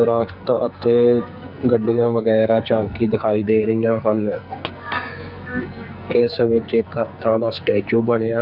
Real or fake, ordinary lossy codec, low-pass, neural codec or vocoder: fake; none; 5.4 kHz; codec, 44.1 kHz, 2.6 kbps, SNAC